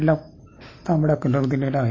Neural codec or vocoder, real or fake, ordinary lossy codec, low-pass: none; real; MP3, 32 kbps; 7.2 kHz